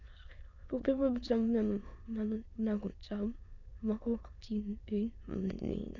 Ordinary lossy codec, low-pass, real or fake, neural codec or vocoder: none; 7.2 kHz; fake; autoencoder, 22.05 kHz, a latent of 192 numbers a frame, VITS, trained on many speakers